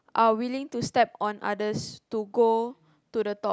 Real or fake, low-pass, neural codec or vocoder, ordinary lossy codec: real; none; none; none